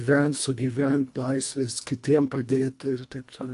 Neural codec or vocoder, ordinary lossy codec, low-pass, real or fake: codec, 24 kHz, 1.5 kbps, HILCodec; AAC, 64 kbps; 10.8 kHz; fake